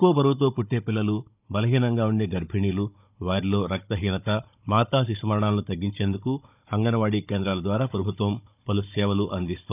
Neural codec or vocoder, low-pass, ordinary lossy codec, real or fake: codec, 16 kHz, 16 kbps, FunCodec, trained on Chinese and English, 50 frames a second; 3.6 kHz; none; fake